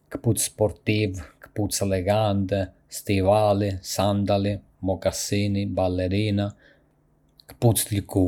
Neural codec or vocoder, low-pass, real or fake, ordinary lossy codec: none; 19.8 kHz; real; none